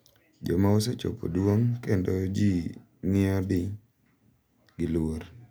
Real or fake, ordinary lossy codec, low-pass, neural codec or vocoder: real; none; none; none